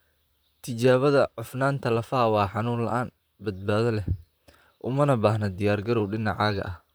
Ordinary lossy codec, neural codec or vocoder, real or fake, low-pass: none; vocoder, 44.1 kHz, 128 mel bands, Pupu-Vocoder; fake; none